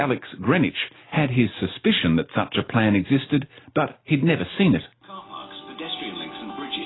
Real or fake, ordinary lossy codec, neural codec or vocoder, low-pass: real; AAC, 16 kbps; none; 7.2 kHz